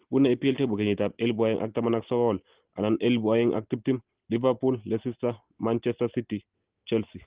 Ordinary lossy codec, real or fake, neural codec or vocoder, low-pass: Opus, 16 kbps; real; none; 3.6 kHz